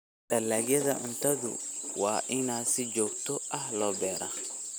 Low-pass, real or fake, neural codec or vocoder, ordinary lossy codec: none; real; none; none